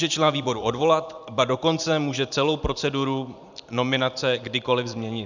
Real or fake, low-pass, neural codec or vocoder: fake; 7.2 kHz; vocoder, 44.1 kHz, 128 mel bands every 512 samples, BigVGAN v2